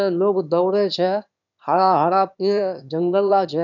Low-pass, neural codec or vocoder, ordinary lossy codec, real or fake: 7.2 kHz; autoencoder, 22.05 kHz, a latent of 192 numbers a frame, VITS, trained on one speaker; none; fake